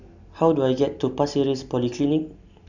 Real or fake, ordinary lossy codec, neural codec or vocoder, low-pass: real; none; none; 7.2 kHz